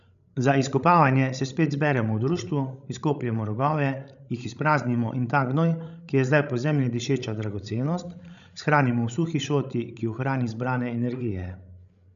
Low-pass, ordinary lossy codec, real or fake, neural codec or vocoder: 7.2 kHz; none; fake; codec, 16 kHz, 16 kbps, FreqCodec, larger model